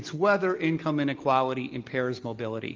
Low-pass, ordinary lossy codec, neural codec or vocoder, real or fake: 7.2 kHz; Opus, 32 kbps; none; real